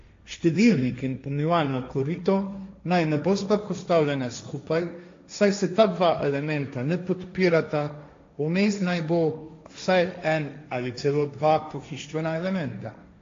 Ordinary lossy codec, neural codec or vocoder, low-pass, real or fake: AAC, 48 kbps; codec, 16 kHz, 1.1 kbps, Voila-Tokenizer; 7.2 kHz; fake